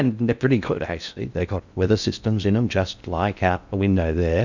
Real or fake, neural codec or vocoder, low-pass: fake; codec, 16 kHz in and 24 kHz out, 0.6 kbps, FocalCodec, streaming, 2048 codes; 7.2 kHz